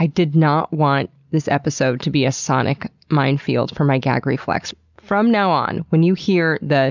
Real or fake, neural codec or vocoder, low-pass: real; none; 7.2 kHz